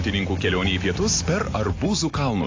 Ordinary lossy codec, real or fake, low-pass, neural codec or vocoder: AAC, 32 kbps; real; 7.2 kHz; none